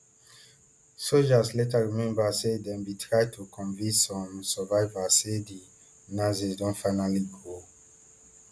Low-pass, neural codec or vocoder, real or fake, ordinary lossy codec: none; none; real; none